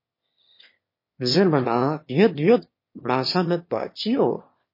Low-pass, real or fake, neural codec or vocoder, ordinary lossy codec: 5.4 kHz; fake; autoencoder, 22.05 kHz, a latent of 192 numbers a frame, VITS, trained on one speaker; MP3, 24 kbps